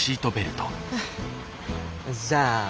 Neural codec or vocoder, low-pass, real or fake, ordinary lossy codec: none; none; real; none